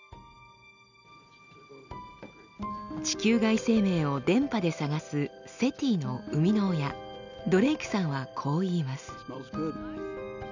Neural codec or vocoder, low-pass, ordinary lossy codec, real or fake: none; 7.2 kHz; none; real